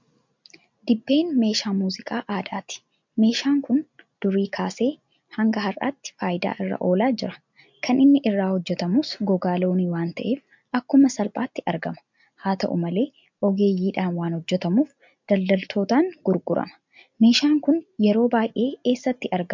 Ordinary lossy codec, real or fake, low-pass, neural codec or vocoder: MP3, 64 kbps; real; 7.2 kHz; none